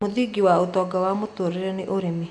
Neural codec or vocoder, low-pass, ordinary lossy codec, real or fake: none; 10.8 kHz; none; real